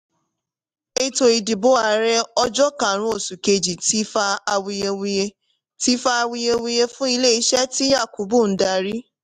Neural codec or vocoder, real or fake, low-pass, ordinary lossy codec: none; real; 14.4 kHz; AAC, 96 kbps